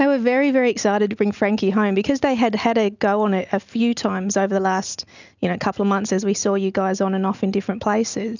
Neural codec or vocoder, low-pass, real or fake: none; 7.2 kHz; real